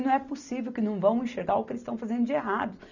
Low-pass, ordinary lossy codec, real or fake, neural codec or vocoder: 7.2 kHz; none; real; none